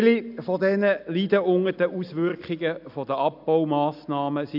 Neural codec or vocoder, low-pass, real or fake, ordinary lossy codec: none; 5.4 kHz; real; none